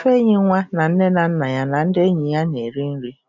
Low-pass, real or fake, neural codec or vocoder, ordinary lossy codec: 7.2 kHz; real; none; none